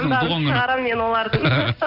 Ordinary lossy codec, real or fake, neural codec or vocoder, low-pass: none; real; none; 5.4 kHz